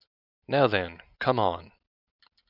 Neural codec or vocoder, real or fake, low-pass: none; real; 5.4 kHz